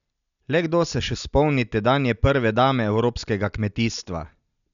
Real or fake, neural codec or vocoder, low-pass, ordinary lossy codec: real; none; 7.2 kHz; none